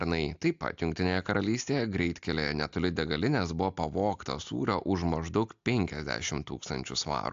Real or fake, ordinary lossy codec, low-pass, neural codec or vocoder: real; AAC, 64 kbps; 7.2 kHz; none